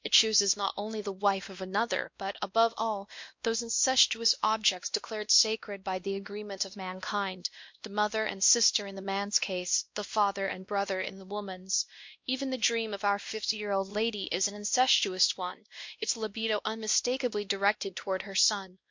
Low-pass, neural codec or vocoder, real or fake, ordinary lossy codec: 7.2 kHz; codec, 16 kHz, 1 kbps, X-Codec, WavLM features, trained on Multilingual LibriSpeech; fake; MP3, 48 kbps